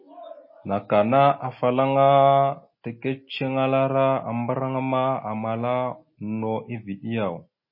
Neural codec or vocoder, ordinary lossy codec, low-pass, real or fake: none; MP3, 24 kbps; 5.4 kHz; real